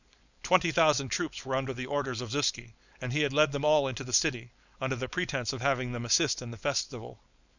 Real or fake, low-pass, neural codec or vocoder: fake; 7.2 kHz; codec, 16 kHz, 4.8 kbps, FACodec